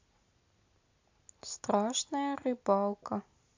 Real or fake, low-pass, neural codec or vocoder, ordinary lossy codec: real; 7.2 kHz; none; AAC, 48 kbps